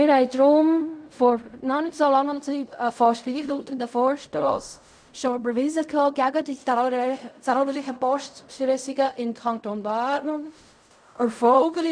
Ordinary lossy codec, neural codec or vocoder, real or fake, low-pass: none; codec, 16 kHz in and 24 kHz out, 0.4 kbps, LongCat-Audio-Codec, fine tuned four codebook decoder; fake; 9.9 kHz